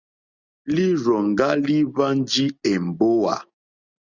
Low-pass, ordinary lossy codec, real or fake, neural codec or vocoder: 7.2 kHz; Opus, 64 kbps; real; none